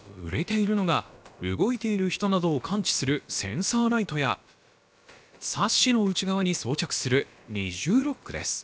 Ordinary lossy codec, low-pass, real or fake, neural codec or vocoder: none; none; fake; codec, 16 kHz, about 1 kbps, DyCAST, with the encoder's durations